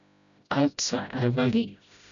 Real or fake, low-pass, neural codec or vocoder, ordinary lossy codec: fake; 7.2 kHz; codec, 16 kHz, 0.5 kbps, FreqCodec, smaller model; MP3, 96 kbps